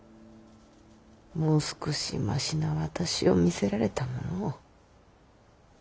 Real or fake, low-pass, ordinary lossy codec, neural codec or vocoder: real; none; none; none